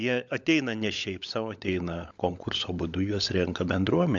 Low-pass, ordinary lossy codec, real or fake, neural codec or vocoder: 7.2 kHz; AAC, 64 kbps; fake; codec, 16 kHz, 16 kbps, FunCodec, trained on LibriTTS, 50 frames a second